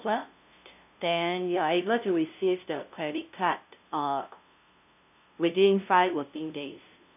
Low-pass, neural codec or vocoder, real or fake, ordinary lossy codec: 3.6 kHz; codec, 16 kHz, 0.5 kbps, FunCodec, trained on LibriTTS, 25 frames a second; fake; none